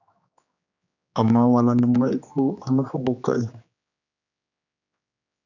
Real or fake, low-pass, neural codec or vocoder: fake; 7.2 kHz; codec, 16 kHz, 2 kbps, X-Codec, HuBERT features, trained on general audio